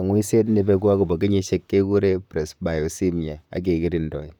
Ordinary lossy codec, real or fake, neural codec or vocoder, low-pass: none; fake; vocoder, 44.1 kHz, 128 mel bands, Pupu-Vocoder; 19.8 kHz